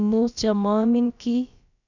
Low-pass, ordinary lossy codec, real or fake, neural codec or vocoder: 7.2 kHz; none; fake; codec, 16 kHz, about 1 kbps, DyCAST, with the encoder's durations